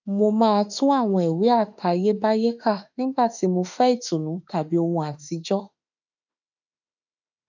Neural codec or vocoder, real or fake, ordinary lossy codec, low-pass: autoencoder, 48 kHz, 32 numbers a frame, DAC-VAE, trained on Japanese speech; fake; none; 7.2 kHz